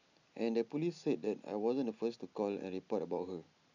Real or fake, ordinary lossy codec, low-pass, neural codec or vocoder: real; none; 7.2 kHz; none